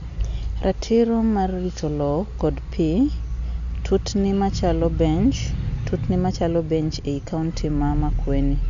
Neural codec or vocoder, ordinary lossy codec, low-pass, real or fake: none; none; 7.2 kHz; real